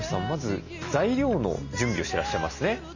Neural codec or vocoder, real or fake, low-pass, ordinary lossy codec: none; real; 7.2 kHz; none